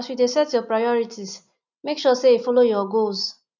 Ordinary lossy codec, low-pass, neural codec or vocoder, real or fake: none; 7.2 kHz; none; real